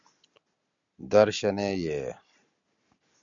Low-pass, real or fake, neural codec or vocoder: 7.2 kHz; real; none